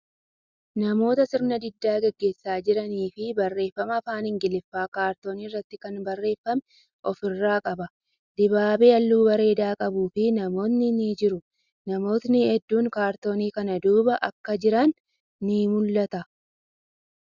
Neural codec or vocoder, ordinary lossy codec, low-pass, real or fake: none; Opus, 64 kbps; 7.2 kHz; real